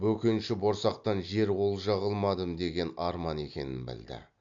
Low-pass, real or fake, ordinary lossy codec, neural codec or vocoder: 7.2 kHz; real; MP3, 64 kbps; none